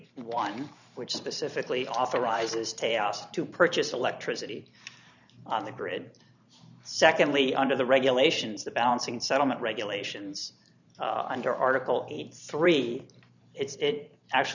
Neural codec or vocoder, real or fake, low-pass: vocoder, 44.1 kHz, 128 mel bands every 512 samples, BigVGAN v2; fake; 7.2 kHz